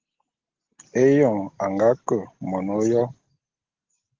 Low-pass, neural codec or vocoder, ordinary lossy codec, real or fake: 7.2 kHz; none; Opus, 16 kbps; real